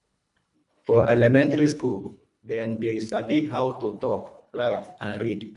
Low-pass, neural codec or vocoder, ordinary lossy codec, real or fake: 10.8 kHz; codec, 24 kHz, 1.5 kbps, HILCodec; none; fake